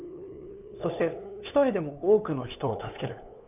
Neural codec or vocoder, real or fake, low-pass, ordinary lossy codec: codec, 16 kHz, 2 kbps, FunCodec, trained on LibriTTS, 25 frames a second; fake; 3.6 kHz; none